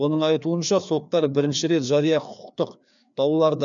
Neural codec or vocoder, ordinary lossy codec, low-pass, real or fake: codec, 16 kHz, 2 kbps, FreqCodec, larger model; MP3, 96 kbps; 7.2 kHz; fake